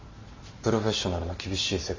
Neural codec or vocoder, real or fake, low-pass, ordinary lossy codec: none; real; 7.2 kHz; MP3, 64 kbps